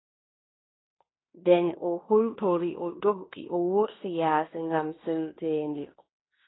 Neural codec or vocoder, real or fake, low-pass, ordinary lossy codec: codec, 16 kHz in and 24 kHz out, 0.9 kbps, LongCat-Audio-Codec, fine tuned four codebook decoder; fake; 7.2 kHz; AAC, 16 kbps